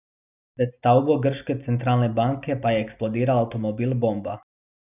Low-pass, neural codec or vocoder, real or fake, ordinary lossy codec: 3.6 kHz; none; real; none